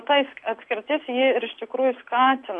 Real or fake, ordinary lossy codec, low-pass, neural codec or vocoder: real; AAC, 64 kbps; 10.8 kHz; none